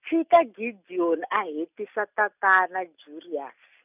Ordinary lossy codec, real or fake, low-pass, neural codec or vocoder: none; real; 3.6 kHz; none